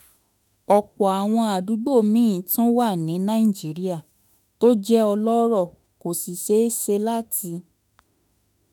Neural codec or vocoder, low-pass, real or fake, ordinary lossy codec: autoencoder, 48 kHz, 32 numbers a frame, DAC-VAE, trained on Japanese speech; none; fake; none